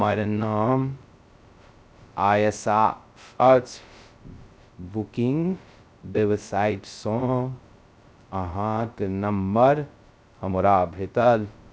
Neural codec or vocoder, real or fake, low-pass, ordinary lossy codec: codec, 16 kHz, 0.2 kbps, FocalCodec; fake; none; none